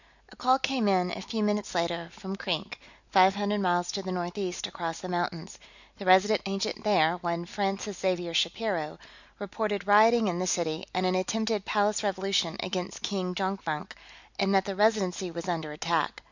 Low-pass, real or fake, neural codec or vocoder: 7.2 kHz; real; none